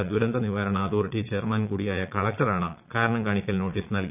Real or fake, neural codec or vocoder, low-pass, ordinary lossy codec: fake; vocoder, 22.05 kHz, 80 mel bands, Vocos; 3.6 kHz; none